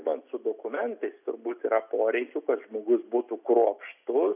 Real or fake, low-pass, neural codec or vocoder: real; 3.6 kHz; none